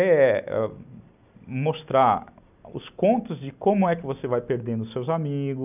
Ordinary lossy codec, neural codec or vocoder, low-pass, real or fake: none; none; 3.6 kHz; real